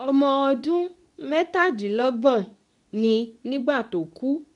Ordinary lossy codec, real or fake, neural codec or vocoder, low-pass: none; fake; codec, 24 kHz, 0.9 kbps, WavTokenizer, medium speech release version 2; 10.8 kHz